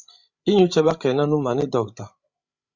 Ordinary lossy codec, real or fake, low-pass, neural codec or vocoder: Opus, 64 kbps; fake; 7.2 kHz; codec, 16 kHz, 16 kbps, FreqCodec, larger model